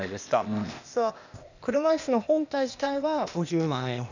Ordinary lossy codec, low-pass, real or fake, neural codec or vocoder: none; 7.2 kHz; fake; codec, 16 kHz, 0.8 kbps, ZipCodec